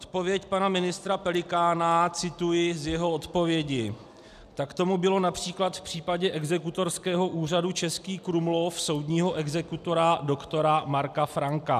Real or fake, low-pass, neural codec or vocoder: real; 14.4 kHz; none